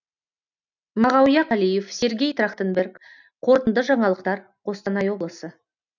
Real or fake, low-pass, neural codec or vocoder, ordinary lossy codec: real; 7.2 kHz; none; none